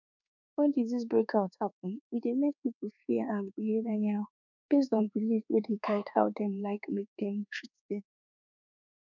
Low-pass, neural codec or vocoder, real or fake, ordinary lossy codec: 7.2 kHz; codec, 24 kHz, 1.2 kbps, DualCodec; fake; none